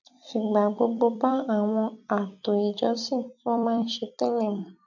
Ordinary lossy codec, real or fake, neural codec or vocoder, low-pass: none; fake; vocoder, 44.1 kHz, 128 mel bands every 256 samples, BigVGAN v2; 7.2 kHz